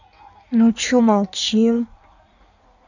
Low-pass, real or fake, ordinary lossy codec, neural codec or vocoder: 7.2 kHz; fake; none; codec, 16 kHz in and 24 kHz out, 1.1 kbps, FireRedTTS-2 codec